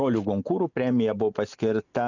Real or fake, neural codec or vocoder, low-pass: real; none; 7.2 kHz